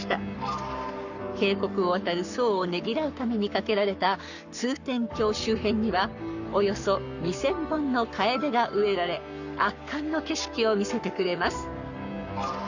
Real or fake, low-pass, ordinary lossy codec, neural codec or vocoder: fake; 7.2 kHz; none; codec, 44.1 kHz, 7.8 kbps, Pupu-Codec